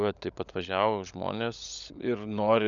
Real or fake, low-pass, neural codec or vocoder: fake; 7.2 kHz; codec, 16 kHz, 16 kbps, FunCodec, trained on LibriTTS, 50 frames a second